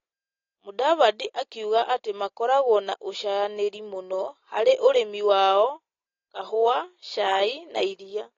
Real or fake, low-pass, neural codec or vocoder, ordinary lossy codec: real; 7.2 kHz; none; AAC, 32 kbps